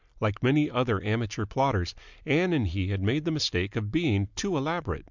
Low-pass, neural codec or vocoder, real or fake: 7.2 kHz; none; real